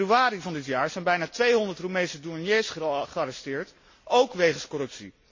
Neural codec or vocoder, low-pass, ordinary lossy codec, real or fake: none; 7.2 kHz; MP3, 32 kbps; real